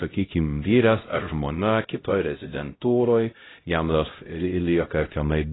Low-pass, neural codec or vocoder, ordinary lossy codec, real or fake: 7.2 kHz; codec, 16 kHz, 0.5 kbps, X-Codec, HuBERT features, trained on LibriSpeech; AAC, 16 kbps; fake